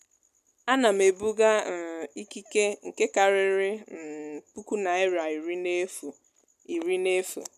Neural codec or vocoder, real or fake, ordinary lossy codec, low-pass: none; real; none; 14.4 kHz